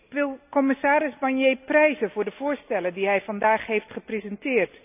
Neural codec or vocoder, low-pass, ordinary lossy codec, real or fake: none; 3.6 kHz; none; real